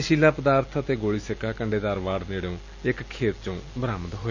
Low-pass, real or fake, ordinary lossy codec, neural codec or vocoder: 7.2 kHz; real; none; none